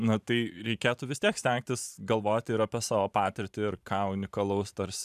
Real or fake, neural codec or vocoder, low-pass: fake; vocoder, 44.1 kHz, 128 mel bands every 512 samples, BigVGAN v2; 14.4 kHz